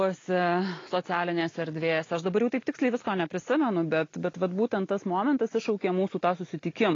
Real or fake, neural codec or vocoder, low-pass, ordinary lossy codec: real; none; 7.2 kHz; AAC, 32 kbps